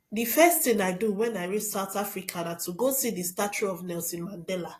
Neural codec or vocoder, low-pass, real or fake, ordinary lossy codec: none; 14.4 kHz; real; AAC, 48 kbps